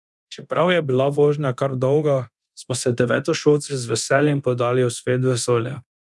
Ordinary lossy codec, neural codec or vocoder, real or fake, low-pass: none; codec, 24 kHz, 0.9 kbps, DualCodec; fake; none